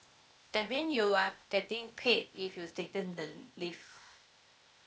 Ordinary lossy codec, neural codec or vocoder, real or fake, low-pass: none; codec, 16 kHz, 0.8 kbps, ZipCodec; fake; none